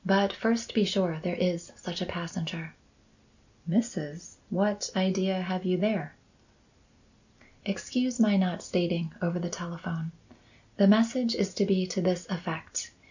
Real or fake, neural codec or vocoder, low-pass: real; none; 7.2 kHz